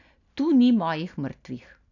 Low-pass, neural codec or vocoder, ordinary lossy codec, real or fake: 7.2 kHz; none; none; real